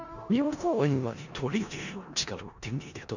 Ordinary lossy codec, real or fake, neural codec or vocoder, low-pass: none; fake; codec, 16 kHz in and 24 kHz out, 0.4 kbps, LongCat-Audio-Codec, four codebook decoder; 7.2 kHz